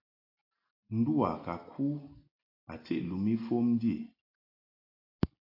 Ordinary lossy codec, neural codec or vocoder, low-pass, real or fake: AAC, 32 kbps; none; 5.4 kHz; real